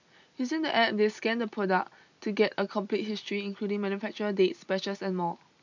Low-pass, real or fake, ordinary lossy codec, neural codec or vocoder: 7.2 kHz; real; none; none